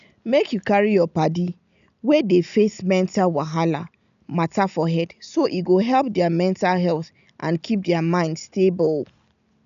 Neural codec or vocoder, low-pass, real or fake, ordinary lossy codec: none; 7.2 kHz; real; none